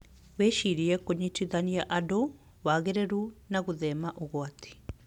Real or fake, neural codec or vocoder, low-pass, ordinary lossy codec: real; none; 19.8 kHz; none